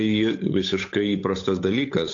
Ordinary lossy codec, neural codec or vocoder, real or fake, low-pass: AAC, 48 kbps; codec, 16 kHz, 8 kbps, FunCodec, trained on Chinese and English, 25 frames a second; fake; 7.2 kHz